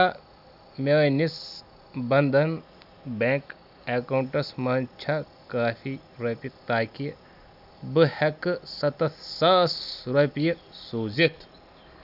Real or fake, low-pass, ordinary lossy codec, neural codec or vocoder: real; 5.4 kHz; none; none